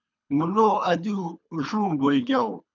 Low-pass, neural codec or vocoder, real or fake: 7.2 kHz; codec, 24 kHz, 3 kbps, HILCodec; fake